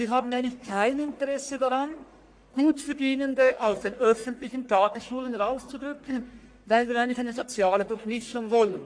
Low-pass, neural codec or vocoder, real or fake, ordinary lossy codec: 9.9 kHz; codec, 44.1 kHz, 1.7 kbps, Pupu-Codec; fake; AAC, 64 kbps